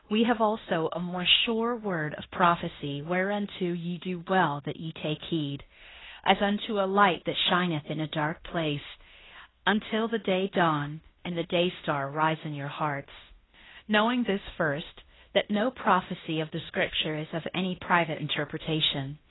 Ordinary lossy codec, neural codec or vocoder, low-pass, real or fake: AAC, 16 kbps; codec, 16 kHz in and 24 kHz out, 0.9 kbps, LongCat-Audio-Codec, fine tuned four codebook decoder; 7.2 kHz; fake